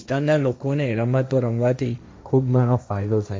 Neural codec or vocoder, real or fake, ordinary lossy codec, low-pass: codec, 16 kHz, 1.1 kbps, Voila-Tokenizer; fake; none; none